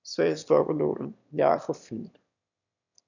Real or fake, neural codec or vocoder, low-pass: fake; autoencoder, 22.05 kHz, a latent of 192 numbers a frame, VITS, trained on one speaker; 7.2 kHz